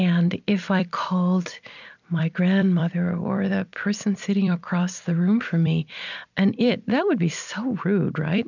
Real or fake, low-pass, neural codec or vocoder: fake; 7.2 kHz; vocoder, 44.1 kHz, 128 mel bands every 256 samples, BigVGAN v2